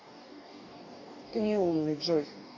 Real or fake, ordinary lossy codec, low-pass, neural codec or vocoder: fake; MP3, 48 kbps; 7.2 kHz; codec, 44.1 kHz, 2.6 kbps, DAC